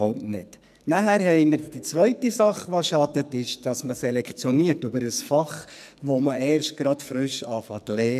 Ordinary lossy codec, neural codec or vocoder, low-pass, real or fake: none; codec, 44.1 kHz, 2.6 kbps, SNAC; 14.4 kHz; fake